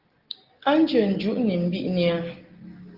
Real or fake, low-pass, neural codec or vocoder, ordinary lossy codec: real; 5.4 kHz; none; Opus, 16 kbps